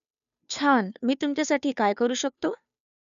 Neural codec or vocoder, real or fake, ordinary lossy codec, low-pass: codec, 16 kHz, 2 kbps, FunCodec, trained on Chinese and English, 25 frames a second; fake; none; 7.2 kHz